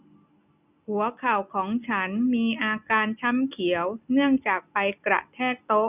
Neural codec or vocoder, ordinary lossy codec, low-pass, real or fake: none; none; 3.6 kHz; real